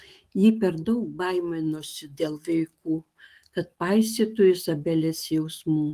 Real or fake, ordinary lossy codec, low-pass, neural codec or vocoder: fake; Opus, 24 kbps; 14.4 kHz; autoencoder, 48 kHz, 128 numbers a frame, DAC-VAE, trained on Japanese speech